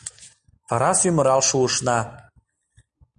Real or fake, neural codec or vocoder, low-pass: real; none; 9.9 kHz